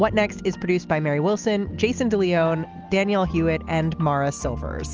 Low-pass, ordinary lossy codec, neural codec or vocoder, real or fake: 7.2 kHz; Opus, 24 kbps; none; real